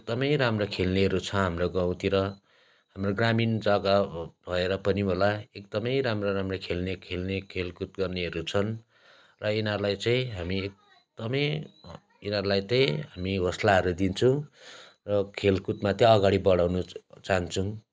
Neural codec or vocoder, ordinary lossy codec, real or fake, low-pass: none; none; real; none